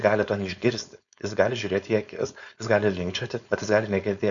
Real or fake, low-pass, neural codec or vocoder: fake; 7.2 kHz; codec, 16 kHz, 4.8 kbps, FACodec